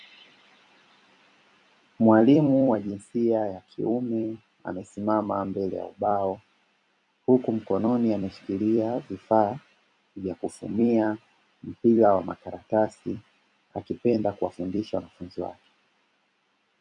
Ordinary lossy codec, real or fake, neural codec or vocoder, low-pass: MP3, 96 kbps; fake; vocoder, 44.1 kHz, 128 mel bands every 256 samples, BigVGAN v2; 10.8 kHz